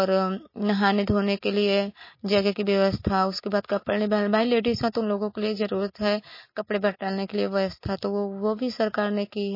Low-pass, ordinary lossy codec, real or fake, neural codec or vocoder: 5.4 kHz; MP3, 24 kbps; real; none